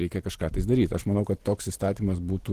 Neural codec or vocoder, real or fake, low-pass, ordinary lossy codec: vocoder, 44.1 kHz, 128 mel bands every 512 samples, BigVGAN v2; fake; 14.4 kHz; Opus, 16 kbps